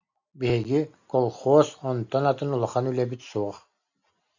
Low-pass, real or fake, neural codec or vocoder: 7.2 kHz; real; none